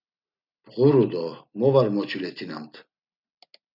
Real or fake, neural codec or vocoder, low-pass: real; none; 5.4 kHz